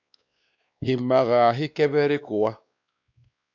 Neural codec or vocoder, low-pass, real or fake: codec, 16 kHz, 2 kbps, X-Codec, WavLM features, trained on Multilingual LibriSpeech; 7.2 kHz; fake